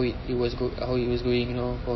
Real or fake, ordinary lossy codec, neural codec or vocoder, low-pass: real; MP3, 24 kbps; none; 7.2 kHz